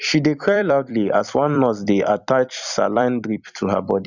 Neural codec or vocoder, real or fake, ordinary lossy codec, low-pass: vocoder, 44.1 kHz, 128 mel bands every 256 samples, BigVGAN v2; fake; none; 7.2 kHz